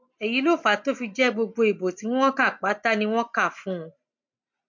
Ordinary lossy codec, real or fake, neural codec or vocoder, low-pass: MP3, 48 kbps; real; none; 7.2 kHz